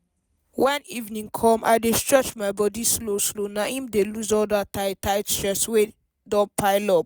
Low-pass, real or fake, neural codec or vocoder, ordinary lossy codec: none; real; none; none